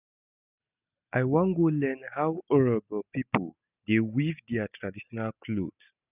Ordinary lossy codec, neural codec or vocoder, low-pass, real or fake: none; none; 3.6 kHz; real